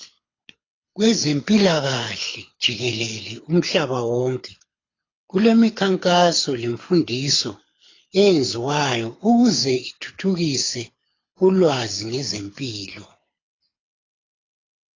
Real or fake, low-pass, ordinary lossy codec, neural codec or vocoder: fake; 7.2 kHz; AAC, 32 kbps; codec, 24 kHz, 6 kbps, HILCodec